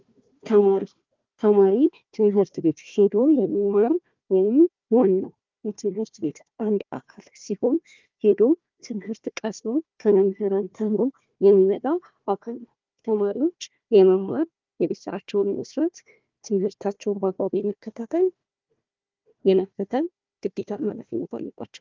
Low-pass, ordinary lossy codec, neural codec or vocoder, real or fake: 7.2 kHz; Opus, 24 kbps; codec, 16 kHz, 1 kbps, FunCodec, trained on Chinese and English, 50 frames a second; fake